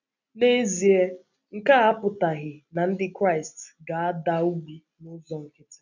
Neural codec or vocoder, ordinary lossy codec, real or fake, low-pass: none; none; real; 7.2 kHz